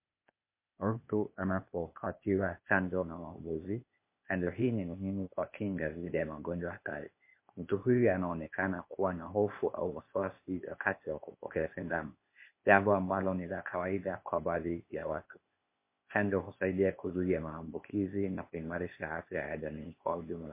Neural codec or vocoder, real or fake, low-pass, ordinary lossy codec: codec, 16 kHz, 0.8 kbps, ZipCodec; fake; 3.6 kHz; MP3, 24 kbps